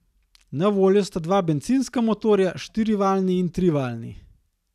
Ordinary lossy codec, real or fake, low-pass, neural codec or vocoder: none; real; 14.4 kHz; none